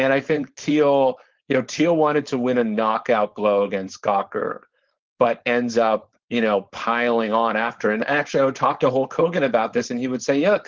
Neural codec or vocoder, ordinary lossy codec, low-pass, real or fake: codec, 16 kHz, 4.8 kbps, FACodec; Opus, 16 kbps; 7.2 kHz; fake